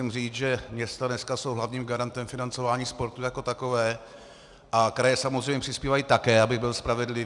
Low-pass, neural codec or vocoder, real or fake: 10.8 kHz; none; real